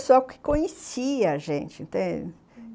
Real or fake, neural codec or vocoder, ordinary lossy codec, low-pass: real; none; none; none